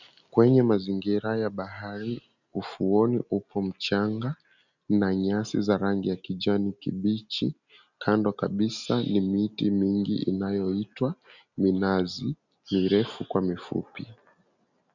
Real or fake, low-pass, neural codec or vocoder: real; 7.2 kHz; none